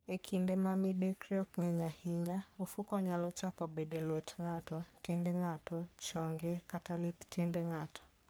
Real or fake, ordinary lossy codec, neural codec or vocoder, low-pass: fake; none; codec, 44.1 kHz, 3.4 kbps, Pupu-Codec; none